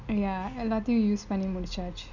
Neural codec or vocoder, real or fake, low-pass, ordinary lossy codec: none; real; 7.2 kHz; none